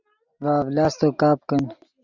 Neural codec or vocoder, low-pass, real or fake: none; 7.2 kHz; real